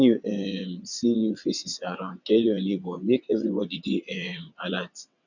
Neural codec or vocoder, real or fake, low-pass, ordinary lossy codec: vocoder, 22.05 kHz, 80 mel bands, WaveNeXt; fake; 7.2 kHz; none